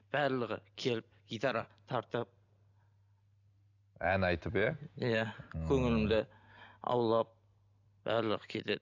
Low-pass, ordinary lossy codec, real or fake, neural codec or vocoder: 7.2 kHz; AAC, 48 kbps; real; none